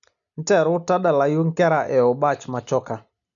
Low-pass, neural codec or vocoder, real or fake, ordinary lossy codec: 7.2 kHz; none; real; none